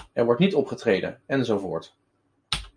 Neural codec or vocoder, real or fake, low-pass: none; real; 9.9 kHz